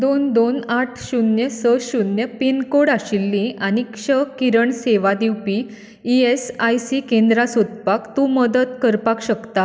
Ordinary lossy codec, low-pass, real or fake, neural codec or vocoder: none; none; real; none